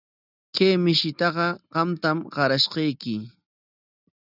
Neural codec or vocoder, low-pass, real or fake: none; 5.4 kHz; real